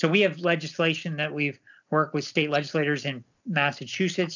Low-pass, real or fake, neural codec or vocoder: 7.2 kHz; real; none